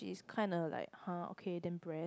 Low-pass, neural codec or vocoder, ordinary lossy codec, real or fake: none; none; none; real